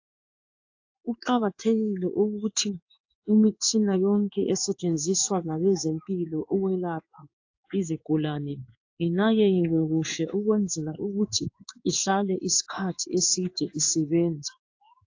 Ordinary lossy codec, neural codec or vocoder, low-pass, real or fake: AAC, 48 kbps; codec, 16 kHz in and 24 kHz out, 1 kbps, XY-Tokenizer; 7.2 kHz; fake